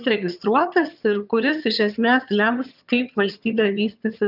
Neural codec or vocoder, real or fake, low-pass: vocoder, 22.05 kHz, 80 mel bands, HiFi-GAN; fake; 5.4 kHz